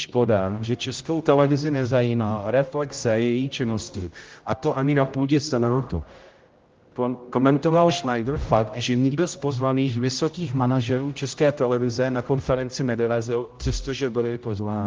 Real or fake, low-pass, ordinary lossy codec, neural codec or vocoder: fake; 7.2 kHz; Opus, 32 kbps; codec, 16 kHz, 0.5 kbps, X-Codec, HuBERT features, trained on general audio